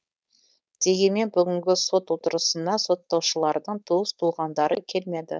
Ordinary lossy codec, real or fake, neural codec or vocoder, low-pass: none; fake; codec, 16 kHz, 4.8 kbps, FACodec; none